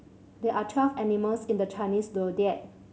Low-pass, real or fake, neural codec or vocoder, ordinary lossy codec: none; real; none; none